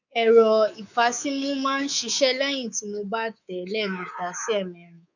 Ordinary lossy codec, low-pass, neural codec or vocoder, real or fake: none; 7.2 kHz; codec, 24 kHz, 3.1 kbps, DualCodec; fake